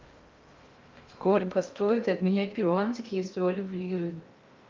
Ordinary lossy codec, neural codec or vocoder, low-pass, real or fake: Opus, 24 kbps; codec, 16 kHz in and 24 kHz out, 0.6 kbps, FocalCodec, streaming, 2048 codes; 7.2 kHz; fake